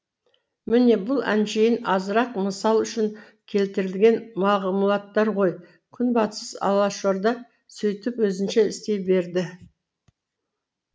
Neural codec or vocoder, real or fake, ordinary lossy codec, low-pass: none; real; none; none